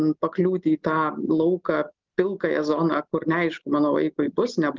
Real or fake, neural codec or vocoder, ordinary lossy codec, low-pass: real; none; Opus, 24 kbps; 7.2 kHz